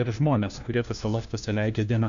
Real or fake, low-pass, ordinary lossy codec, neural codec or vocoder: fake; 7.2 kHz; AAC, 96 kbps; codec, 16 kHz, 1 kbps, FunCodec, trained on LibriTTS, 50 frames a second